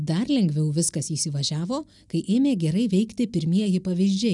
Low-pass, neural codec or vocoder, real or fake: 10.8 kHz; none; real